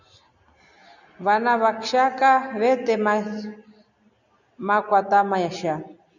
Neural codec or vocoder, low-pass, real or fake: none; 7.2 kHz; real